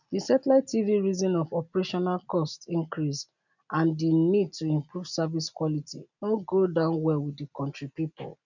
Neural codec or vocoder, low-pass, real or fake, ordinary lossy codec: none; 7.2 kHz; real; none